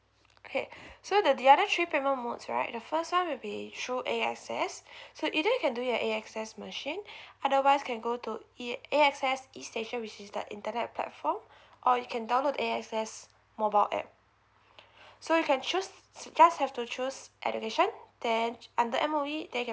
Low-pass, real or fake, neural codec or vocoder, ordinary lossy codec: none; real; none; none